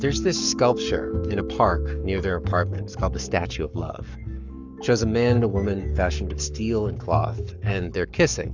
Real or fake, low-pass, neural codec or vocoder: fake; 7.2 kHz; codec, 44.1 kHz, 7.8 kbps, Pupu-Codec